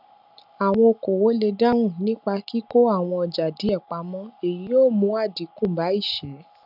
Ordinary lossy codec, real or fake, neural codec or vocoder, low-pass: none; real; none; 5.4 kHz